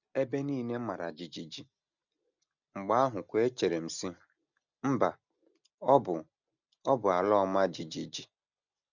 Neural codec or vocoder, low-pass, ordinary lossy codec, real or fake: none; 7.2 kHz; none; real